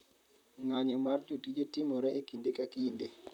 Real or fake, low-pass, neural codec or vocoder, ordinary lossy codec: fake; 19.8 kHz; vocoder, 44.1 kHz, 128 mel bands, Pupu-Vocoder; none